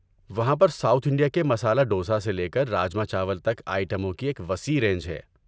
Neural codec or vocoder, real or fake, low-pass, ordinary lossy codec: none; real; none; none